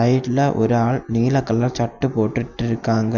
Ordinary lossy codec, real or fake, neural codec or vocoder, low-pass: none; real; none; 7.2 kHz